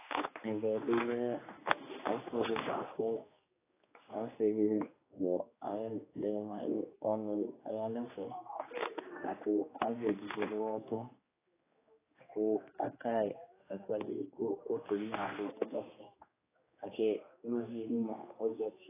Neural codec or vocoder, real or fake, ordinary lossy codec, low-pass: codec, 16 kHz, 2 kbps, X-Codec, HuBERT features, trained on general audio; fake; AAC, 16 kbps; 3.6 kHz